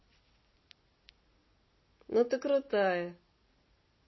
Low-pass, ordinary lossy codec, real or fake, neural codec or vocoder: 7.2 kHz; MP3, 24 kbps; real; none